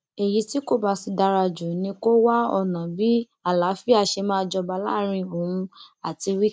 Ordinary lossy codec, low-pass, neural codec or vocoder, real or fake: none; none; none; real